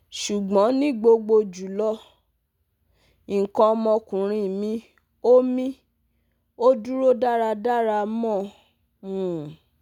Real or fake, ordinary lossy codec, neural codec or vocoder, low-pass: real; none; none; 19.8 kHz